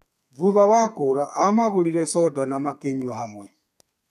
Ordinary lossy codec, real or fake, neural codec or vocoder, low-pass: none; fake; codec, 32 kHz, 1.9 kbps, SNAC; 14.4 kHz